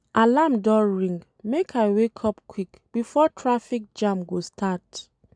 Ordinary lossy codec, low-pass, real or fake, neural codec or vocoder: none; 9.9 kHz; real; none